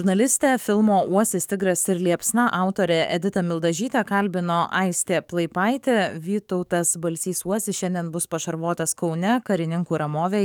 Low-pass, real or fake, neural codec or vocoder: 19.8 kHz; fake; codec, 44.1 kHz, 7.8 kbps, DAC